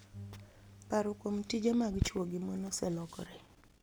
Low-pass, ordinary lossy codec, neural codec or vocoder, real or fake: none; none; none; real